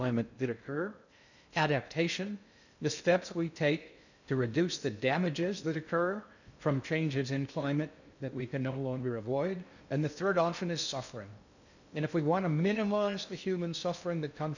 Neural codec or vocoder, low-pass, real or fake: codec, 16 kHz in and 24 kHz out, 0.6 kbps, FocalCodec, streaming, 2048 codes; 7.2 kHz; fake